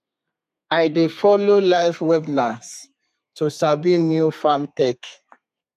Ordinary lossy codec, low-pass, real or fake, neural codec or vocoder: MP3, 96 kbps; 14.4 kHz; fake; codec, 32 kHz, 1.9 kbps, SNAC